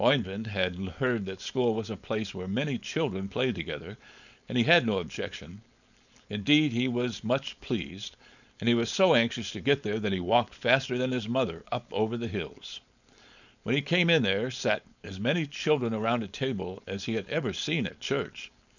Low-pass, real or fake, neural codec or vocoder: 7.2 kHz; fake; codec, 16 kHz, 4.8 kbps, FACodec